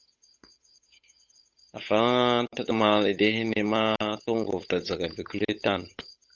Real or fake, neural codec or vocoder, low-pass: fake; codec, 16 kHz, 8 kbps, FunCodec, trained on Chinese and English, 25 frames a second; 7.2 kHz